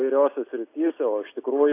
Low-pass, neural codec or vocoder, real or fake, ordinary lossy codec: 3.6 kHz; none; real; AAC, 24 kbps